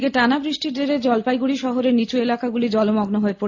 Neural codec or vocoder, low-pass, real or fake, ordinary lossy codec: none; 7.2 kHz; real; AAC, 48 kbps